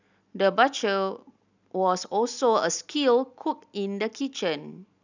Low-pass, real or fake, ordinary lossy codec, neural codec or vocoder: 7.2 kHz; real; none; none